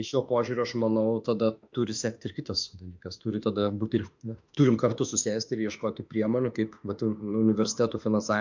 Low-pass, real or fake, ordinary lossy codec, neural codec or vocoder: 7.2 kHz; fake; MP3, 64 kbps; autoencoder, 48 kHz, 32 numbers a frame, DAC-VAE, trained on Japanese speech